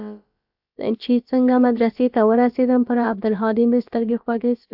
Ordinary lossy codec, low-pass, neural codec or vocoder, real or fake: AAC, 48 kbps; 5.4 kHz; codec, 16 kHz, about 1 kbps, DyCAST, with the encoder's durations; fake